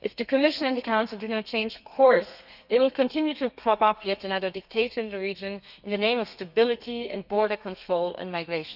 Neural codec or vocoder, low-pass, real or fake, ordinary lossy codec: codec, 32 kHz, 1.9 kbps, SNAC; 5.4 kHz; fake; none